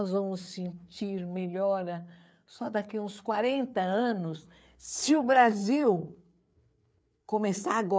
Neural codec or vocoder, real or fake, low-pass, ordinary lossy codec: codec, 16 kHz, 4 kbps, FreqCodec, larger model; fake; none; none